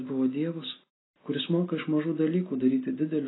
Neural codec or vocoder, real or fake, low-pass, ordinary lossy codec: none; real; 7.2 kHz; AAC, 16 kbps